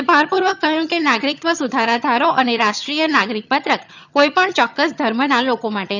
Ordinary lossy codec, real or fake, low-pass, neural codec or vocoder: none; fake; 7.2 kHz; vocoder, 22.05 kHz, 80 mel bands, HiFi-GAN